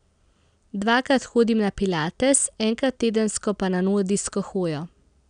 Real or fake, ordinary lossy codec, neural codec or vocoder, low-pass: real; none; none; 9.9 kHz